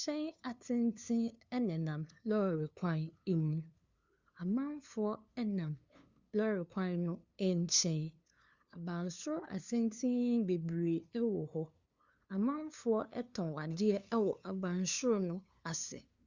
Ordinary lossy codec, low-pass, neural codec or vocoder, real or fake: Opus, 64 kbps; 7.2 kHz; codec, 16 kHz, 2 kbps, FunCodec, trained on LibriTTS, 25 frames a second; fake